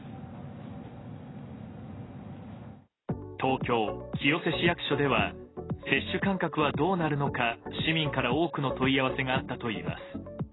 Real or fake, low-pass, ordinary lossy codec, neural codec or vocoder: real; 7.2 kHz; AAC, 16 kbps; none